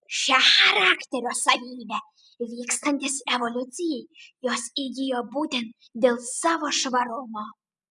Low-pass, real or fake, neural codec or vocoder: 10.8 kHz; real; none